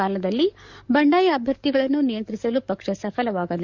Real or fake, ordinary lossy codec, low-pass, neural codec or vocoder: fake; Opus, 64 kbps; 7.2 kHz; codec, 44.1 kHz, 7.8 kbps, DAC